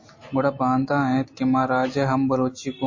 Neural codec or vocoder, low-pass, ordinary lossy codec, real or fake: none; 7.2 kHz; MP3, 32 kbps; real